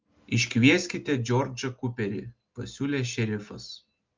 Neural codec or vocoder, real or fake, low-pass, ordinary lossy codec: none; real; 7.2 kHz; Opus, 32 kbps